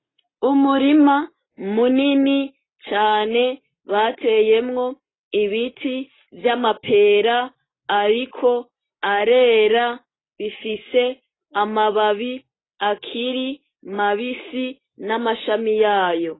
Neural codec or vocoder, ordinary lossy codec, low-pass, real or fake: none; AAC, 16 kbps; 7.2 kHz; real